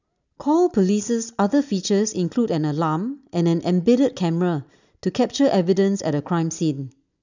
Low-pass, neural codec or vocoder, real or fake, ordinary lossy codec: 7.2 kHz; none; real; none